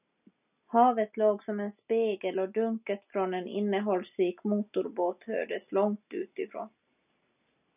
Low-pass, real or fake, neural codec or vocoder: 3.6 kHz; real; none